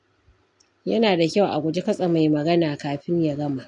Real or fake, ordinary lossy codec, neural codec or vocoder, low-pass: real; AAC, 64 kbps; none; 10.8 kHz